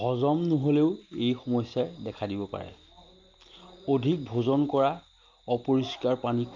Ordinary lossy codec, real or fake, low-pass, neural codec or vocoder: Opus, 32 kbps; real; 7.2 kHz; none